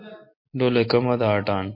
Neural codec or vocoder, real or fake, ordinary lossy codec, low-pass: none; real; MP3, 32 kbps; 5.4 kHz